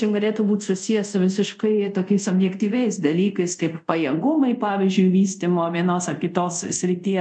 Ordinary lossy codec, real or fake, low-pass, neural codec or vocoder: MP3, 96 kbps; fake; 9.9 kHz; codec, 24 kHz, 0.5 kbps, DualCodec